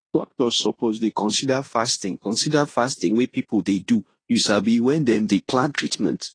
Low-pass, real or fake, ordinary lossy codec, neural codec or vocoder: 9.9 kHz; fake; AAC, 32 kbps; codec, 16 kHz in and 24 kHz out, 0.9 kbps, LongCat-Audio-Codec, fine tuned four codebook decoder